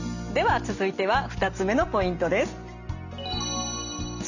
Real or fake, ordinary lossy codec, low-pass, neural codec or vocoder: real; none; 7.2 kHz; none